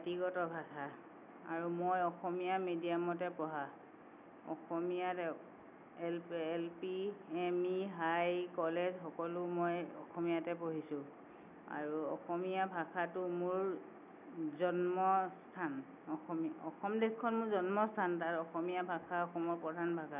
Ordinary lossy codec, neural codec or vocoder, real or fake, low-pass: none; none; real; 3.6 kHz